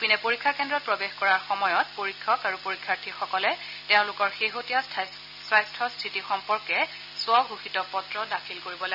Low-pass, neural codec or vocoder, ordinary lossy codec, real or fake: 5.4 kHz; none; none; real